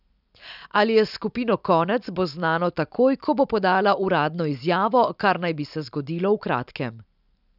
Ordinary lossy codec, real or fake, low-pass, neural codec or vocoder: none; real; 5.4 kHz; none